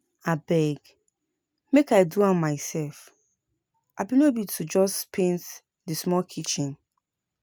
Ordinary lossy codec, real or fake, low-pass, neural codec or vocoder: none; real; none; none